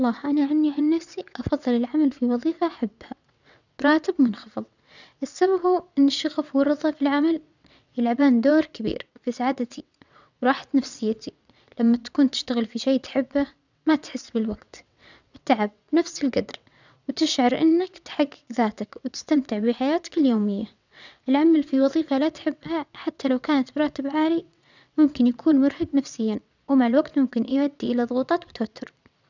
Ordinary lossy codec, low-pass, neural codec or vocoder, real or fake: none; 7.2 kHz; vocoder, 22.05 kHz, 80 mel bands, WaveNeXt; fake